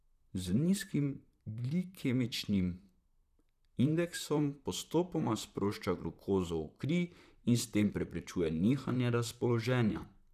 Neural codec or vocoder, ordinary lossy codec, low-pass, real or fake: vocoder, 44.1 kHz, 128 mel bands, Pupu-Vocoder; none; 14.4 kHz; fake